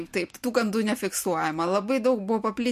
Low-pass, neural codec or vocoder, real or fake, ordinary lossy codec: 14.4 kHz; none; real; MP3, 64 kbps